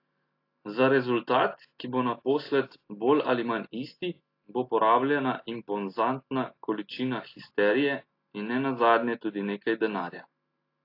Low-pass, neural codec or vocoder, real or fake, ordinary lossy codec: 5.4 kHz; none; real; AAC, 32 kbps